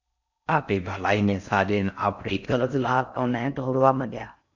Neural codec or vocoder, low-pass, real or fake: codec, 16 kHz in and 24 kHz out, 0.6 kbps, FocalCodec, streaming, 4096 codes; 7.2 kHz; fake